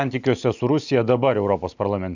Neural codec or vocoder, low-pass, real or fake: none; 7.2 kHz; real